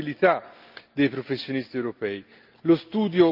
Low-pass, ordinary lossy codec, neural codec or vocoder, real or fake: 5.4 kHz; Opus, 24 kbps; none; real